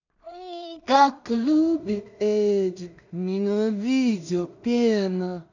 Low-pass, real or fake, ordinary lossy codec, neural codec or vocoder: 7.2 kHz; fake; none; codec, 16 kHz in and 24 kHz out, 0.4 kbps, LongCat-Audio-Codec, two codebook decoder